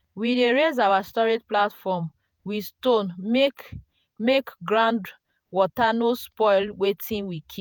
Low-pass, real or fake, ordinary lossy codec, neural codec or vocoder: none; fake; none; vocoder, 48 kHz, 128 mel bands, Vocos